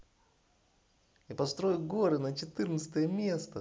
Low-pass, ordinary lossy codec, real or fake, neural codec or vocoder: none; none; real; none